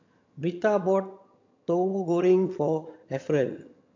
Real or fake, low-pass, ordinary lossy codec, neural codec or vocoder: fake; 7.2 kHz; MP3, 48 kbps; vocoder, 22.05 kHz, 80 mel bands, HiFi-GAN